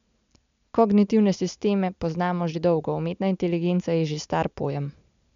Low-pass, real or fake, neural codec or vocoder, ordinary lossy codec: 7.2 kHz; real; none; MP3, 64 kbps